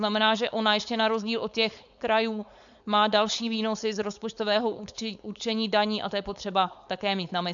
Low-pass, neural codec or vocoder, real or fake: 7.2 kHz; codec, 16 kHz, 4.8 kbps, FACodec; fake